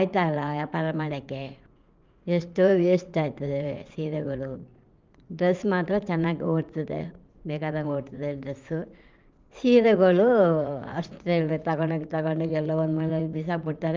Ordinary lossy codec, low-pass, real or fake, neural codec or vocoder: Opus, 24 kbps; 7.2 kHz; fake; vocoder, 44.1 kHz, 80 mel bands, Vocos